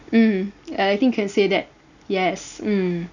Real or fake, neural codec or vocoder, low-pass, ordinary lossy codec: real; none; 7.2 kHz; none